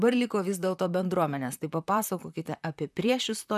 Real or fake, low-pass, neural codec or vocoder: fake; 14.4 kHz; vocoder, 44.1 kHz, 128 mel bands, Pupu-Vocoder